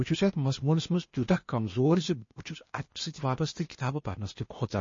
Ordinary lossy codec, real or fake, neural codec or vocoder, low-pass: MP3, 32 kbps; fake; codec, 16 kHz, 0.8 kbps, ZipCodec; 7.2 kHz